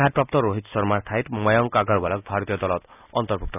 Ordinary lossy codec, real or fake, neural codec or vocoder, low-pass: none; real; none; 3.6 kHz